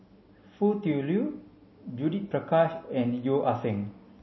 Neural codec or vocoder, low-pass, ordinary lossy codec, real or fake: none; 7.2 kHz; MP3, 24 kbps; real